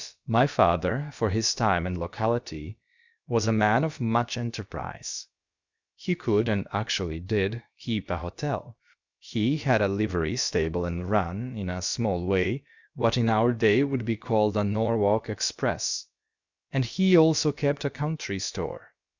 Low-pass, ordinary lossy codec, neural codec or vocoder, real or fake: 7.2 kHz; Opus, 64 kbps; codec, 16 kHz, about 1 kbps, DyCAST, with the encoder's durations; fake